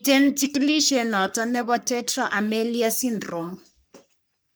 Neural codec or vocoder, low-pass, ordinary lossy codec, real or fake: codec, 44.1 kHz, 3.4 kbps, Pupu-Codec; none; none; fake